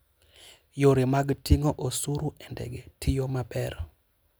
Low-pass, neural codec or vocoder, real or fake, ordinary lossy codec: none; none; real; none